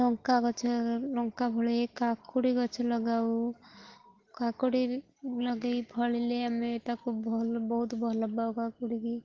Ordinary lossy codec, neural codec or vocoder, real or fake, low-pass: Opus, 16 kbps; none; real; 7.2 kHz